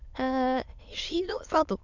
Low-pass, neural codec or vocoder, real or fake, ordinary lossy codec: 7.2 kHz; autoencoder, 22.05 kHz, a latent of 192 numbers a frame, VITS, trained on many speakers; fake; none